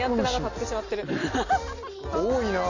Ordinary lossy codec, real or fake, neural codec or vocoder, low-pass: none; real; none; 7.2 kHz